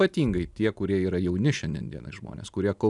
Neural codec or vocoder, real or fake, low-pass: none; real; 10.8 kHz